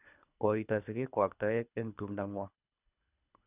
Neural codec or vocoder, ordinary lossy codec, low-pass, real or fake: codec, 24 kHz, 3 kbps, HILCodec; none; 3.6 kHz; fake